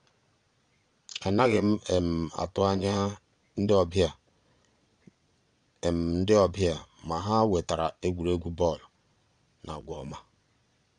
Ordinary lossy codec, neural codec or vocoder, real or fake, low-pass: none; vocoder, 22.05 kHz, 80 mel bands, Vocos; fake; 9.9 kHz